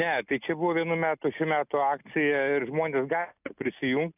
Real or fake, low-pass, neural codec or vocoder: real; 3.6 kHz; none